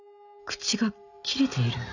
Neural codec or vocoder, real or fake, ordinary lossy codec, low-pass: none; real; none; 7.2 kHz